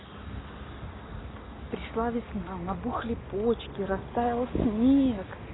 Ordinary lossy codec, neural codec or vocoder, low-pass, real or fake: AAC, 16 kbps; none; 7.2 kHz; real